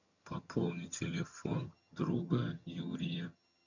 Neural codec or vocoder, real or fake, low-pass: vocoder, 22.05 kHz, 80 mel bands, HiFi-GAN; fake; 7.2 kHz